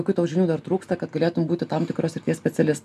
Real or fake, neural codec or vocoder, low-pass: real; none; 14.4 kHz